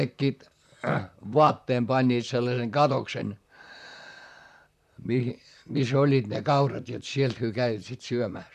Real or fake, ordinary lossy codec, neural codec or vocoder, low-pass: fake; none; vocoder, 44.1 kHz, 128 mel bands, Pupu-Vocoder; 14.4 kHz